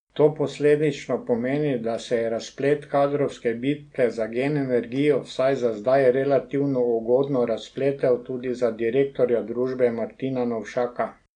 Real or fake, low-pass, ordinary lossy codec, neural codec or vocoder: real; 9.9 kHz; none; none